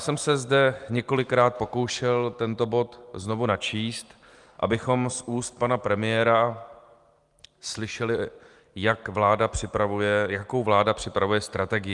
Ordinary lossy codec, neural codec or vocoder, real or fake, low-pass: Opus, 32 kbps; none; real; 10.8 kHz